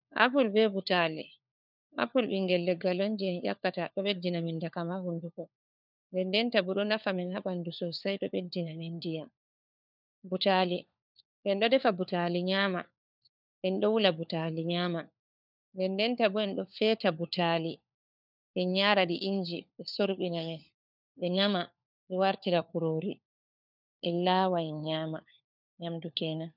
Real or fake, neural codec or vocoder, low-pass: fake; codec, 16 kHz, 4 kbps, FunCodec, trained on LibriTTS, 50 frames a second; 5.4 kHz